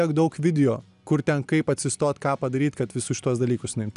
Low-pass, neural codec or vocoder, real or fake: 10.8 kHz; none; real